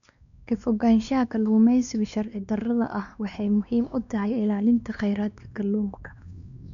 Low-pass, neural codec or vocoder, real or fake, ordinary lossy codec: 7.2 kHz; codec, 16 kHz, 2 kbps, X-Codec, WavLM features, trained on Multilingual LibriSpeech; fake; none